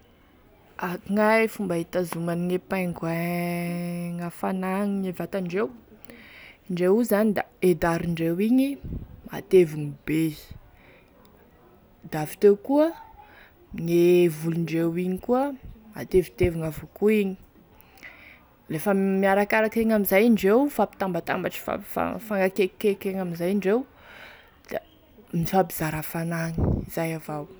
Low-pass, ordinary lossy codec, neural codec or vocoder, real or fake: none; none; none; real